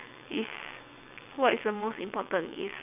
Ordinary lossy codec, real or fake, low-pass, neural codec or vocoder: none; fake; 3.6 kHz; vocoder, 22.05 kHz, 80 mel bands, WaveNeXt